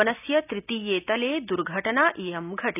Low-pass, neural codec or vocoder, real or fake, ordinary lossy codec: 3.6 kHz; none; real; none